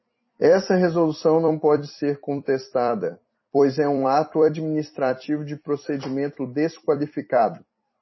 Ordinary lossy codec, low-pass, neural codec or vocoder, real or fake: MP3, 24 kbps; 7.2 kHz; vocoder, 44.1 kHz, 128 mel bands every 256 samples, BigVGAN v2; fake